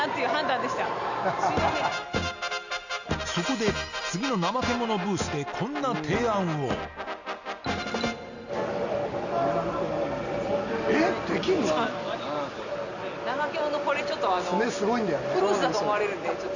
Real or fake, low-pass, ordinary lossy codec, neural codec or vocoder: real; 7.2 kHz; none; none